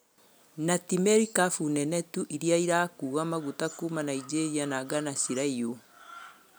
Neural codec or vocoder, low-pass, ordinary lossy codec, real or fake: none; none; none; real